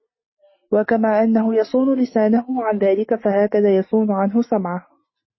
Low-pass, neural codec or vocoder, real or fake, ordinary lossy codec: 7.2 kHz; none; real; MP3, 24 kbps